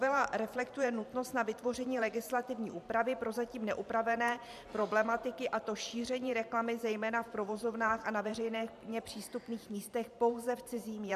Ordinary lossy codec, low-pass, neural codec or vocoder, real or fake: MP3, 96 kbps; 14.4 kHz; vocoder, 44.1 kHz, 128 mel bands every 512 samples, BigVGAN v2; fake